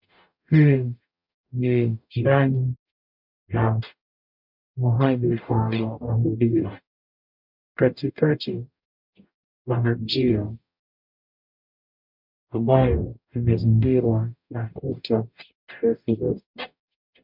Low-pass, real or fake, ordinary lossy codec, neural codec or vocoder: 5.4 kHz; fake; MP3, 48 kbps; codec, 44.1 kHz, 0.9 kbps, DAC